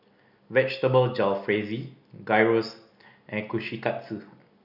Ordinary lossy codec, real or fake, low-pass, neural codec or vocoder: none; real; 5.4 kHz; none